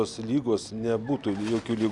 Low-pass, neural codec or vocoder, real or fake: 10.8 kHz; none; real